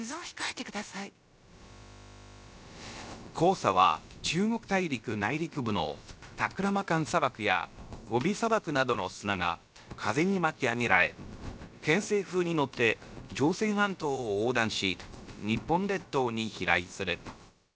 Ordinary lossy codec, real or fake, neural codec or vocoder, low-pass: none; fake; codec, 16 kHz, about 1 kbps, DyCAST, with the encoder's durations; none